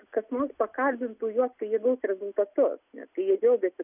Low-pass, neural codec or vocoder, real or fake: 3.6 kHz; none; real